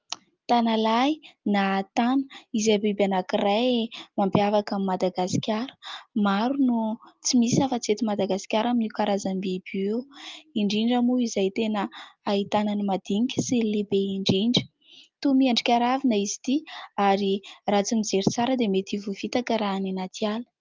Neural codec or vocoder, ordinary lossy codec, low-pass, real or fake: none; Opus, 24 kbps; 7.2 kHz; real